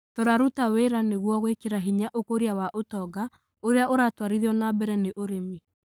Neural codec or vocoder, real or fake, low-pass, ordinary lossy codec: codec, 44.1 kHz, 7.8 kbps, Pupu-Codec; fake; none; none